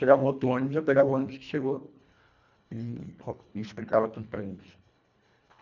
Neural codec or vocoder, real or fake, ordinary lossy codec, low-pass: codec, 24 kHz, 1.5 kbps, HILCodec; fake; none; 7.2 kHz